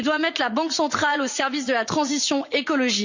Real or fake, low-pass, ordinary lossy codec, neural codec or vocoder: fake; 7.2 kHz; Opus, 64 kbps; vocoder, 44.1 kHz, 80 mel bands, Vocos